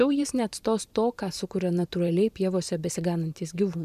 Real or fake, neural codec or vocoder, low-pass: fake; vocoder, 44.1 kHz, 128 mel bands every 512 samples, BigVGAN v2; 14.4 kHz